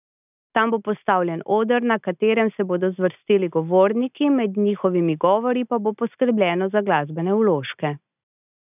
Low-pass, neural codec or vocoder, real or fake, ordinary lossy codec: 3.6 kHz; none; real; none